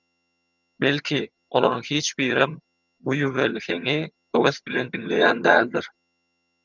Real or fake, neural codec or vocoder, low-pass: fake; vocoder, 22.05 kHz, 80 mel bands, HiFi-GAN; 7.2 kHz